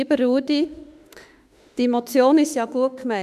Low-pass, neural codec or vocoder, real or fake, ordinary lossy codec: 14.4 kHz; autoencoder, 48 kHz, 32 numbers a frame, DAC-VAE, trained on Japanese speech; fake; none